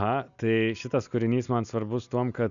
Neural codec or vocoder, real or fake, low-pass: none; real; 7.2 kHz